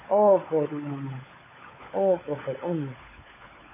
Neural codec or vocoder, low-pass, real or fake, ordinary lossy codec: codec, 16 kHz, 16 kbps, FunCodec, trained on LibriTTS, 50 frames a second; 3.6 kHz; fake; MP3, 16 kbps